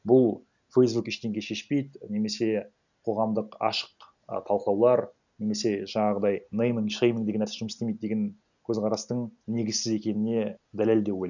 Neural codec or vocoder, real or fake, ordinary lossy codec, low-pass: none; real; none; 7.2 kHz